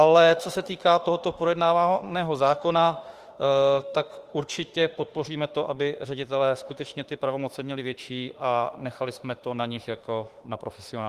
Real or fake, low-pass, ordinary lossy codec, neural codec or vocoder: fake; 14.4 kHz; Opus, 24 kbps; autoencoder, 48 kHz, 32 numbers a frame, DAC-VAE, trained on Japanese speech